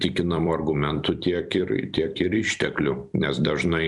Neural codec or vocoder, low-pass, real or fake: vocoder, 44.1 kHz, 128 mel bands every 256 samples, BigVGAN v2; 10.8 kHz; fake